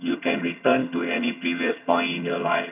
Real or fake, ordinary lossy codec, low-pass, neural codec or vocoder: fake; AAC, 32 kbps; 3.6 kHz; vocoder, 22.05 kHz, 80 mel bands, HiFi-GAN